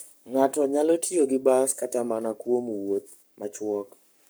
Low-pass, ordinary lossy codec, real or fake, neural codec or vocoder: none; none; fake; codec, 44.1 kHz, 7.8 kbps, Pupu-Codec